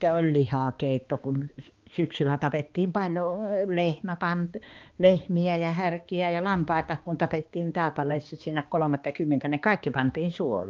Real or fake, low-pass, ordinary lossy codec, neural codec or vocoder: fake; 7.2 kHz; Opus, 32 kbps; codec, 16 kHz, 2 kbps, X-Codec, HuBERT features, trained on balanced general audio